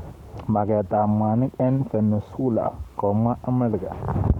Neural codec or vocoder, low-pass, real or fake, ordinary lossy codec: codec, 44.1 kHz, 7.8 kbps, Pupu-Codec; 19.8 kHz; fake; none